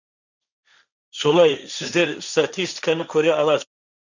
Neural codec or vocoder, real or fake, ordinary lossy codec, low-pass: codec, 16 kHz, 1.1 kbps, Voila-Tokenizer; fake; none; 7.2 kHz